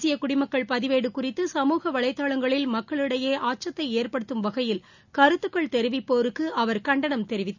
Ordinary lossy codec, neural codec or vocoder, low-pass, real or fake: none; none; 7.2 kHz; real